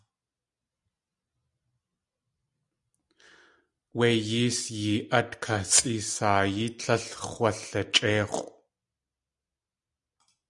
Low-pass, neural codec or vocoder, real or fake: 10.8 kHz; none; real